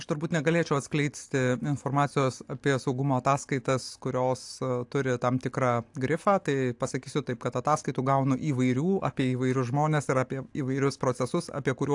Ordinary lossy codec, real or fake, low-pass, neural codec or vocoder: AAC, 64 kbps; real; 10.8 kHz; none